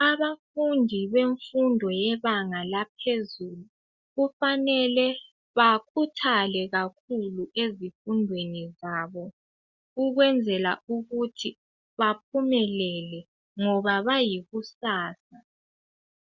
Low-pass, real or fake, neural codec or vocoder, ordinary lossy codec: 7.2 kHz; real; none; Opus, 64 kbps